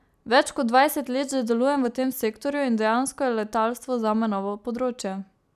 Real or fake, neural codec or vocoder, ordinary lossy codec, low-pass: real; none; none; 14.4 kHz